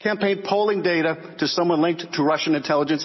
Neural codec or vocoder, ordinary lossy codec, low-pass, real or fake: none; MP3, 24 kbps; 7.2 kHz; real